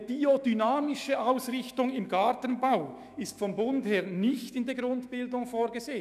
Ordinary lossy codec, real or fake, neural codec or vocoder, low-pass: none; fake; autoencoder, 48 kHz, 128 numbers a frame, DAC-VAE, trained on Japanese speech; 14.4 kHz